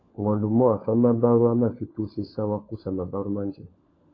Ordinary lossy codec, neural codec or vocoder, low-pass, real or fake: AAC, 32 kbps; codec, 16 kHz, 16 kbps, FunCodec, trained on LibriTTS, 50 frames a second; 7.2 kHz; fake